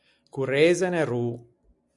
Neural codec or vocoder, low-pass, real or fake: none; 10.8 kHz; real